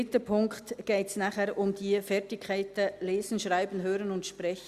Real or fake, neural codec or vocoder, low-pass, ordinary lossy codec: real; none; 14.4 kHz; Opus, 64 kbps